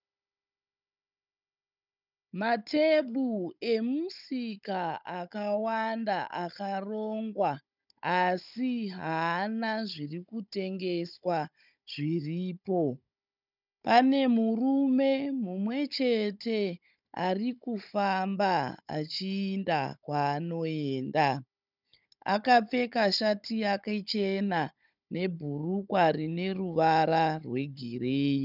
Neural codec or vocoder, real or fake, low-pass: codec, 16 kHz, 16 kbps, FunCodec, trained on Chinese and English, 50 frames a second; fake; 5.4 kHz